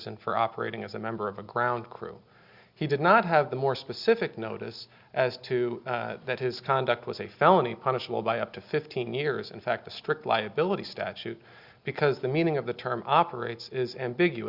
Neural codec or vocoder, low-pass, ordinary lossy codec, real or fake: none; 5.4 kHz; Opus, 64 kbps; real